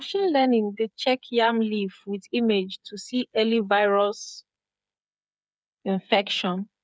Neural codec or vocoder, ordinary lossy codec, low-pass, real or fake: codec, 16 kHz, 8 kbps, FreqCodec, smaller model; none; none; fake